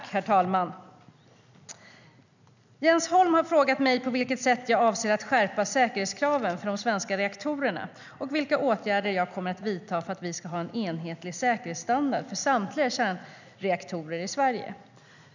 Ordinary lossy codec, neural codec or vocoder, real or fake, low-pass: none; none; real; 7.2 kHz